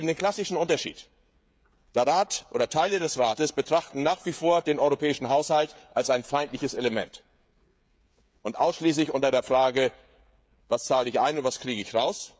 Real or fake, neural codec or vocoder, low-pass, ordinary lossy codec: fake; codec, 16 kHz, 16 kbps, FreqCodec, smaller model; none; none